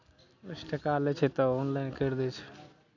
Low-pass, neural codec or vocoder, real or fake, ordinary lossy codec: 7.2 kHz; none; real; none